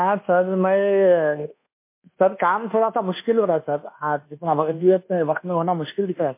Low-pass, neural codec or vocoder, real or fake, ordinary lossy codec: 3.6 kHz; codec, 24 kHz, 1.2 kbps, DualCodec; fake; MP3, 24 kbps